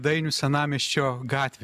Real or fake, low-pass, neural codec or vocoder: real; 14.4 kHz; none